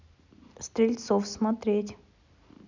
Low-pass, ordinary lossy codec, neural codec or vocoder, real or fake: 7.2 kHz; none; none; real